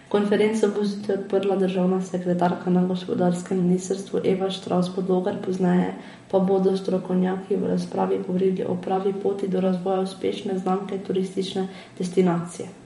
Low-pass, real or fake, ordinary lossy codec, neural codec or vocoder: 19.8 kHz; fake; MP3, 48 kbps; vocoder, 44.1 kHz, 128 mel bands every 512 samples, BigVGAN v2